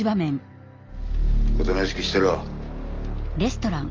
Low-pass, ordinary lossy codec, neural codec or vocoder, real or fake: 7.2 kHz; Opus, 32 kbps; none; real